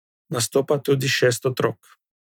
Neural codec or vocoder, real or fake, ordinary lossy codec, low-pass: none; real; none; 19.8 kHz